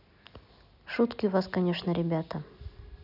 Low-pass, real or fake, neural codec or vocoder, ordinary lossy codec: 5.4 kHz; real; none; none